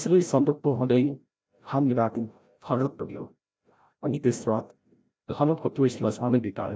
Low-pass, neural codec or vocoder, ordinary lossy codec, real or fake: none; codec, 16 kHz, 0.5 kbps, FreqCodec, larger model; none; fake